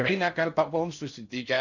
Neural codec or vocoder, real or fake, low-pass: codec, 16 kHz in and 24 kHz out, 0.6 kbps, FocalCodec, streaming, 4096 codes; fake; 7.2 kHz